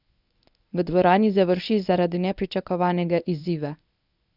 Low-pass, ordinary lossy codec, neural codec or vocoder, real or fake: 5.4 kHz; none; codec, 24 kHz, 0.9 kbps, WavTokenizer, medium speech release version 1; fake